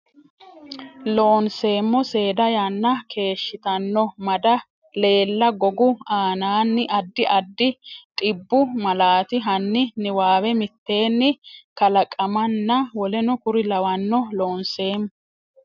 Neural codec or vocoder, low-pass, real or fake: none; 7.2 kHz; real